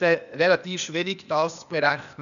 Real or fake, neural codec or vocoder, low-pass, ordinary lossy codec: fake; codec, 16 kHz, 0.8 kbps, ZipCodec; 7.2 kHz; none